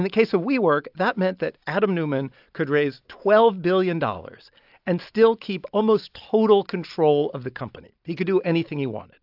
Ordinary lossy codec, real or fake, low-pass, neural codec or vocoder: AAC, 48 kbps; real; 5.4 kHz; none